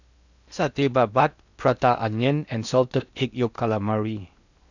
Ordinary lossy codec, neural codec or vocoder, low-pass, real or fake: none; codec, 16 kHz in and 24 kHz out, 0.8 kbps, FocalCodec, streaming, 65536 codes; 7.2 kHz; fake